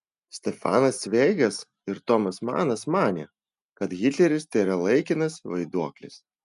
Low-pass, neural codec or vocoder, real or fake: 10.8 kHz; none; real